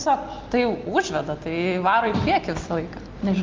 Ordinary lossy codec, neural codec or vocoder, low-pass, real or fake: Opus, 24 kbps; none; 7.2 kHz; real